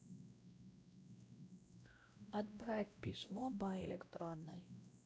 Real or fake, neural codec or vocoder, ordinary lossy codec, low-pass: fake; codec, 16 kHz, 0.5 kbps, X-Codec, WavLM features, trained on Multilingual LibriSpeech; none; none